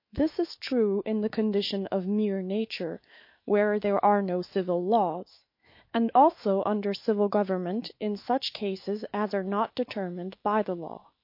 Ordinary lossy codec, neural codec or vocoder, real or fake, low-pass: MP3, 32 kbps; autoencoder, 48 kHz, 128 numbers a frame, DAC-VAE, trained on Japanese speech; fake; 5.4 kHz